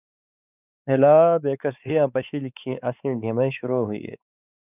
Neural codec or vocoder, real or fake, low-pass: codec, 16 kHz, 4 kbps, X-Codec, WavLM features, trained on Multilingual LibriSpeech; fake; 3.6 kHz